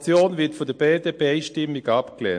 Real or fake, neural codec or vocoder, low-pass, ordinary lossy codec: real; none; 9.9 kHz; MP3, 48 kbps